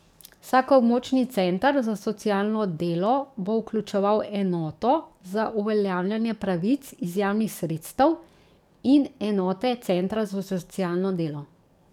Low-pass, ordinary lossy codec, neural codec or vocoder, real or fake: 19.8 kHz; none; codec, 44.1 kHz, 7.8 kbps, DAC; fake